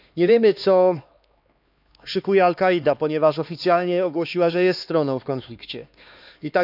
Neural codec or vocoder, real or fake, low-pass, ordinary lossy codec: codec, 16 kHz, 2 kbps, X-Codec, HuBERT features, trained on LibriSpeech; fake; 5.4 kHz; none